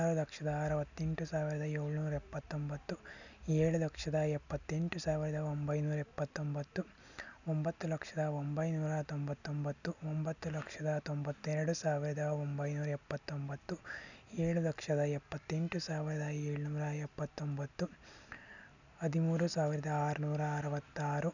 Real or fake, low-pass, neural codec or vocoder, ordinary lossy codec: real; 7.2 kHz; none; none